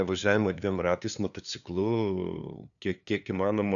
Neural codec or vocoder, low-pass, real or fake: codec, 16 kHz, 2 kbps, FunCodec, trained on LibriTTS, 25 frames a second; 7.2 kHz; fake